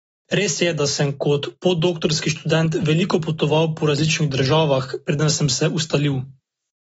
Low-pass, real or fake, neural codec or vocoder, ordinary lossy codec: 19.8 kHz; real; none; AAC, 24 kbps